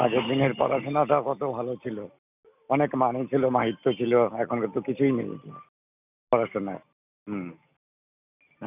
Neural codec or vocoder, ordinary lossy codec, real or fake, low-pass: none; none; real; 3.6 kHz